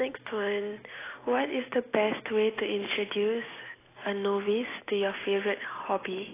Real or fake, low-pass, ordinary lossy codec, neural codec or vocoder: real; 3.6 kHz; AAC, 16 kbps; none